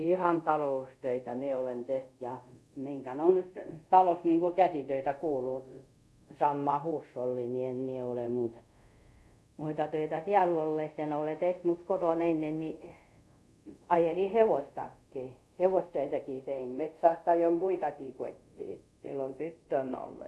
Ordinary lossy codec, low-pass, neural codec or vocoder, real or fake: none; none; codec, 24 kHz, 0.5 kbps, DualCodec; fake